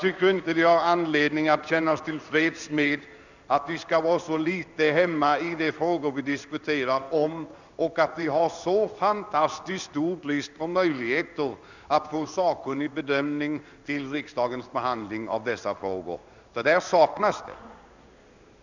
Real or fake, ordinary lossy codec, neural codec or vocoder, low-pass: fake; none; codec, 16 kHz in and 24 kHz out, 1 kbps, XY-Tokenizer; 7.2 kHz